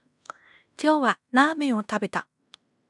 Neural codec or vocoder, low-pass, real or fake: codec, 16 kHz in and 24 kHz out, 0.9 kbps, LongCat-Audio-Codec, fine tuned four codebook decoder; 10.8 kHz; fake